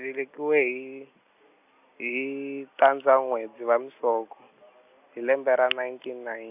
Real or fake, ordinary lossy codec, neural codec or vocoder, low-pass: real; none; none; 3.6 kHz